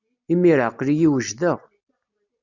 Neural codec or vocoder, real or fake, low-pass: none; real; 7.2 kHz